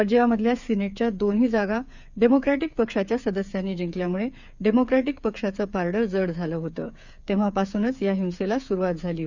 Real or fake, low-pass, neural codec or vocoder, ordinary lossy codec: fake; 7.2 kHz; codec, 16 kHz, 8 kbps, FreqCodec, smaller model; none